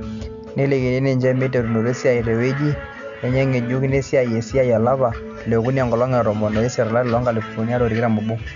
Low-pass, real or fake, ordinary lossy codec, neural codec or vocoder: 7.2 kHz; real; none; none